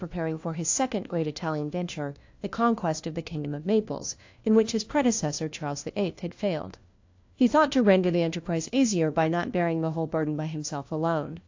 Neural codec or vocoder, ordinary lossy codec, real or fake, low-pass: codec, 16 kHz, 1 kbps, FunCodec, trained on LibriTTS, 50 frames a second; AAC, 48 kbps; fake; 7.2 kHz